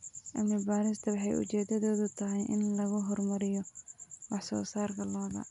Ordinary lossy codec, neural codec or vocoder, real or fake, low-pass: none; none; real; 10.8 kHz